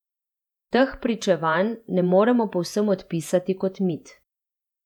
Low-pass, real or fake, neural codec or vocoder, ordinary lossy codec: 19.8 kHz; fake; vocoder, 48 kHz, 128 mel bands, Vocos; none